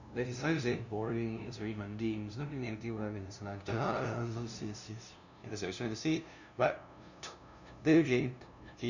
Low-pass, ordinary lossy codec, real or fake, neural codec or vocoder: 7.2 kHz; none; fake; codec, 16 kHz, 0.5 kbps, FunCodec, trained on LibriTTS, 25 frames a second